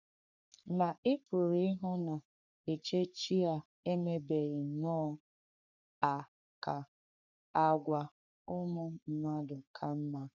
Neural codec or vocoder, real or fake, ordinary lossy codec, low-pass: codec, 44.1 kHz, 3.4 kbps, Pupu-Codec; fake; none; 7.2 kHz